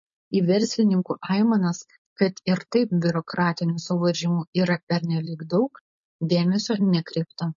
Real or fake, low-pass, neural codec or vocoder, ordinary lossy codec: fake; 7.2 kHz; codec, 16 kHz, 4.8 kbps, FACodec; MP3, 32 kbps